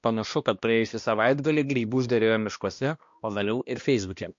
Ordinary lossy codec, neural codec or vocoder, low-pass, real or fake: MP3, 48 kbps; codec, 16 kHz, 1 kbps, X-Codec, HuBERT features, trained on balanced general audio; 7.2 kHz; fake